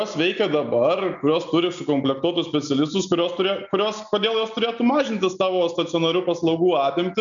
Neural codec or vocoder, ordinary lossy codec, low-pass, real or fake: none; MP3, 96 kbps; 7.2 kHz; real